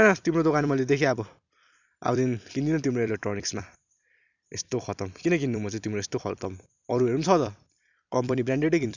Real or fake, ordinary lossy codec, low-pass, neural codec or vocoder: fake; none; 7.2 kHz; vocoder, 44.1 kHz, 128 mel bands every 256 samples, BigVGAN v2